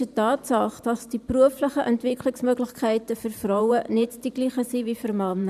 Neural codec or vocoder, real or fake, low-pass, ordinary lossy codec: vocoder, 44.1 kHz, 128 mel bands every 512 samples, BigVGAN v2; fake; 14.4 kHz; none